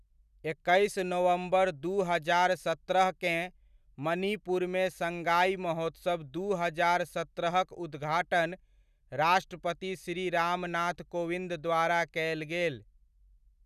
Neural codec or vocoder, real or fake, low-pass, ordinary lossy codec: none; real; 14.4 kHz; none